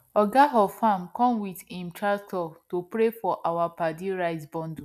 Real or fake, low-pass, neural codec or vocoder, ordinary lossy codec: real; 14.4 kHz; none; none